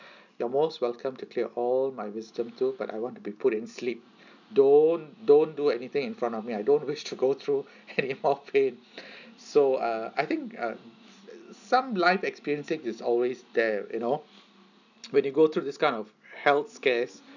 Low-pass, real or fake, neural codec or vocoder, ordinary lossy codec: 7.2 kHz; real; none; none